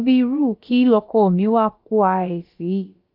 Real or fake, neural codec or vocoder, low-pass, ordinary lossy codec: fake; codec, 16 kHz, about 1 kbps, DyCAST, with the encoder's durations; 5.4 kHz; Opus, 24 kbps